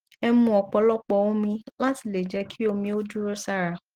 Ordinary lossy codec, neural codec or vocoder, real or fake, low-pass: Opus, 16 kbps; none; real; 19.8 kHz